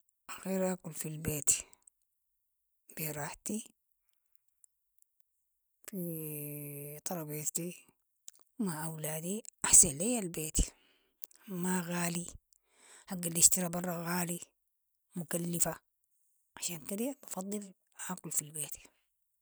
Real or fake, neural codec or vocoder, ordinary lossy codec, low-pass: real; none; none; none